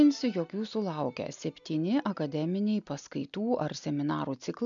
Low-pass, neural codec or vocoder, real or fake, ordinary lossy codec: 7.2 kHz; none; real; MP3, 96 kbps